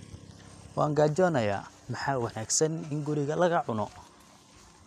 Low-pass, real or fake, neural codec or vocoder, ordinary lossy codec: 14.4 kHz; real; none; none